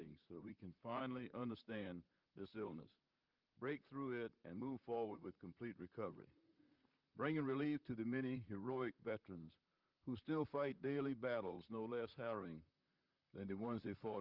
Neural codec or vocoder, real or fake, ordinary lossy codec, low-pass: vocoder, 44.1 kHz, 128 mel bands, Pupu-Vocoder; fake; Opus, 32 kbps; 5.4 kHz